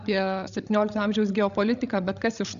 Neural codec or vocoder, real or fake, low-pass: codec, 16 kHz, 16 kbps, FreqCodec, larger model; fake; 7.2 kHz